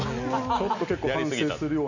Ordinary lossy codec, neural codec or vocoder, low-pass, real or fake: none; none; 7.2 kHz; real